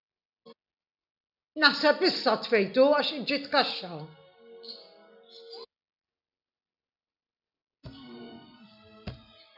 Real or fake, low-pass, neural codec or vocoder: real; 5.4 kHz; none